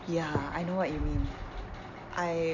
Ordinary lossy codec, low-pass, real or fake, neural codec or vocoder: none; 7.2 kHz; real; none